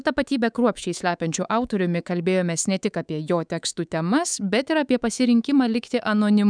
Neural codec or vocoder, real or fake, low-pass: codec, 24 kHz, 3.1 kbps, DualCodec; fake; 9.9 kHz